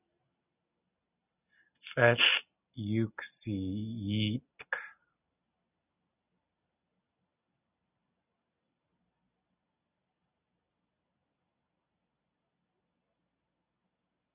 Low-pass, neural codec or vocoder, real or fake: 3.6 kHz; none; real